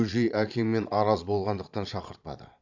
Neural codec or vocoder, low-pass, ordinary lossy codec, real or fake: vocoder, 22.05 kHz, 80 mel bands, Vocos; 7.2 kHz; none; fake